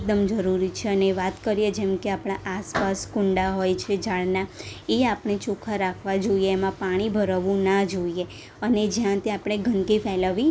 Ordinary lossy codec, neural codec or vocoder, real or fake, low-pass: none; none; real; none